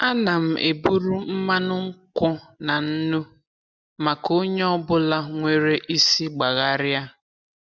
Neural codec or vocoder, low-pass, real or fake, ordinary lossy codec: none; none; real; none